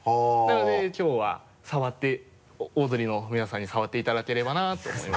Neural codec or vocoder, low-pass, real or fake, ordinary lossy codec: none; none; real; none